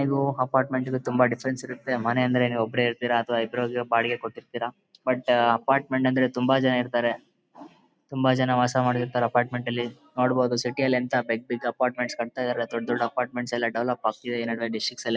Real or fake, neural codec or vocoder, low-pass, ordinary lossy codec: real; none; none; none